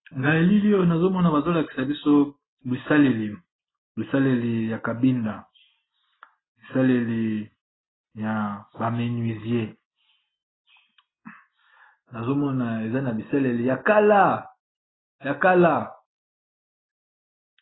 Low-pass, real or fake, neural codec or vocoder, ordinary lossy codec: 7.2 kHz; real; none; AAC, 16 kbps